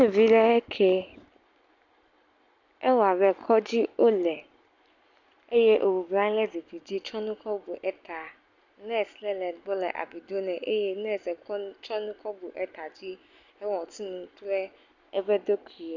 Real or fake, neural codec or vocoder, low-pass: real; none; 7.2 kHz